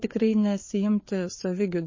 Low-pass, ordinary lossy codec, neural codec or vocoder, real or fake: 7.2 kHz; MP3, 32 kbps; codec, 16 kHz, 4 kbps, FreqCodec, larger model; fake